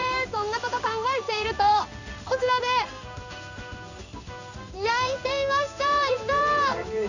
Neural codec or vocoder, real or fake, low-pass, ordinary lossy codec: codec, 16 kHz in and 24 kHz out, 1 kbps, XY-Tokenizer; fake; 7.2 kHz; none